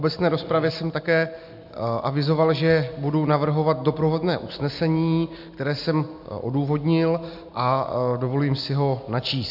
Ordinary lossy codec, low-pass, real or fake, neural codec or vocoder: MP3, 48 kbps; 5.4 kHz; real; none